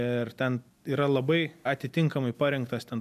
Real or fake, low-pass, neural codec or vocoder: real; 14.4 kHz; none